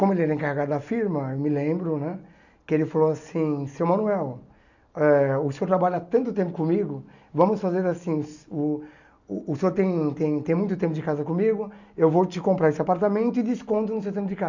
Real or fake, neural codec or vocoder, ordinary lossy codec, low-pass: real; none; none; 7.2 kHz